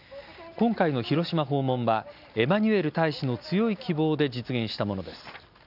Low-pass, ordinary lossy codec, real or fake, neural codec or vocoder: 5.4 kHz; none; real; none